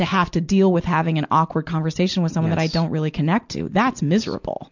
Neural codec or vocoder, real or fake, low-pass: none; real; 7.2 kHz